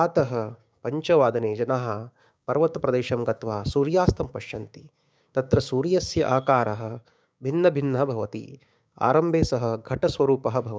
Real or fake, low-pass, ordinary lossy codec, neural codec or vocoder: fake; none; none; codec, 16 kHz, 6 kbps, DAC